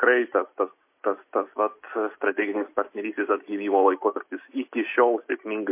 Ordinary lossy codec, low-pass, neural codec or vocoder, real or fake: MP3, 24 kbps; 3.6 kHz; none; real